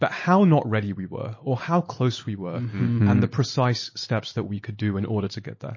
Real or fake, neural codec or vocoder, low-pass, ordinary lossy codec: real; none; 7.2 kHz; MP3, 32 kbps